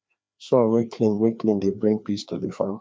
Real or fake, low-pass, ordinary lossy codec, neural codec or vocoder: fake; none; none; codec, 16 kHz, 2 kbps, FreqCodec, larger model